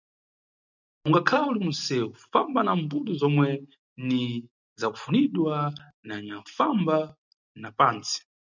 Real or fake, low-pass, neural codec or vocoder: real; 7.2 kHz; none